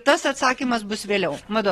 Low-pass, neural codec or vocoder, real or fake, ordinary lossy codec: 19.8 kHz; none; real; AAC, 32 kbps